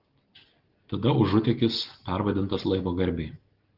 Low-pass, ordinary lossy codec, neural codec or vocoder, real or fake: 5.4 kHz; Opus, 16 kbps; none; real